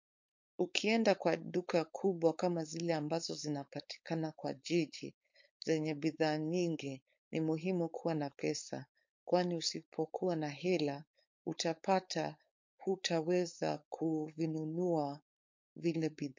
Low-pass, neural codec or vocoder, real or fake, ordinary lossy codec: 7.2 kHz; codec, 16 kHz, 4.8 kbps, FACodec; fake; MP3, 48 kbps